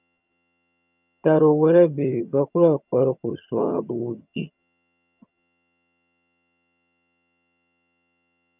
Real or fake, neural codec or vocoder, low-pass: fake; vocoder, 22.05 kHz, 80 mel bands, HiFi-GAN; 3.6 kHz